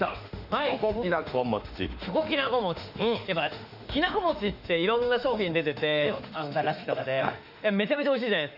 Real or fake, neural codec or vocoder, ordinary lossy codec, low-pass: fake; autoencoder, 48 kHz, 32 numbers a frame, DAC-VAE, trained on Japanese speech; none; 5.4 kHz